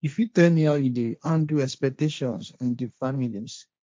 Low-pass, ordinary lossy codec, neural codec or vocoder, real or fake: none; none; codec, 16 kHz, 1.1 kbps, Voila-Tokenizer; fake